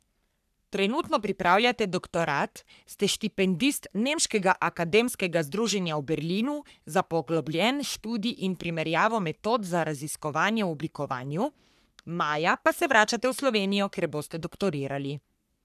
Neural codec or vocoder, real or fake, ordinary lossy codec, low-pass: codec, 44.1 kHz, 3.4 kbps, Pupu-Codec; fake; none; 14.4 kHz